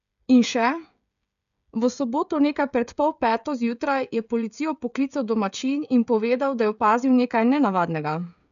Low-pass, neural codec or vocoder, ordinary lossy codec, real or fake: 7.2 kHz; codec, 16 kHz, 16 kbps, FreqCodec, smaller model; none; fake